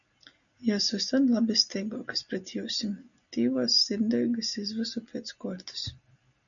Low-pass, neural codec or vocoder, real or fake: 7.2 kHz; none; real